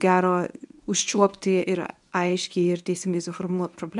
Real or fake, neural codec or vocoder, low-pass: fake; codec, 24 kHz, 0.9 kbps, WavTokenizer, medium speech release version 1; 10.8 kHz